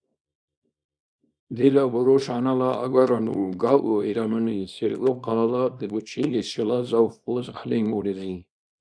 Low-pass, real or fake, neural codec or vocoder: 9.9 kHz; fake; codec, 24 kHz, 0.9 kbps, WavTokenizer, small release